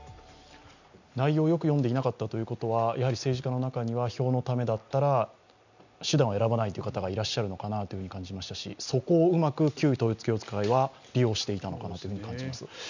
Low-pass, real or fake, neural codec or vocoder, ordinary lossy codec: 7.2 kHz; real; none; none